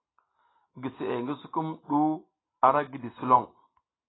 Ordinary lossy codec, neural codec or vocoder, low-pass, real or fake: AAC, 16 kbps; none; 7.2 kHz; real